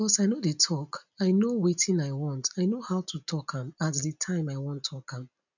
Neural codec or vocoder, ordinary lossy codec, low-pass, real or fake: none; none; 7.2 kHz; real